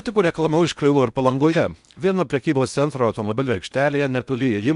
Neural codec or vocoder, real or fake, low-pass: codec, 16 kHz in and 24 kHz out, 0.6 kbps, FocalCodec, streaming, 4096 codes; fake; 10.8 kHz